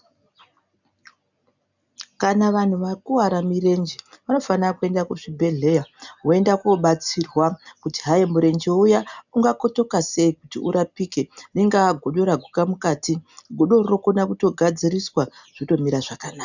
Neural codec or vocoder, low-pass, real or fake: none; 7.2 kHz; real